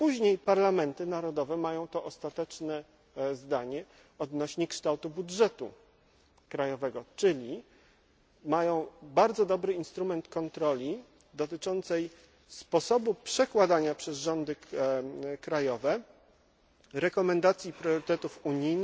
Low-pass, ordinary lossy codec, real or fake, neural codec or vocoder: none; none; real; none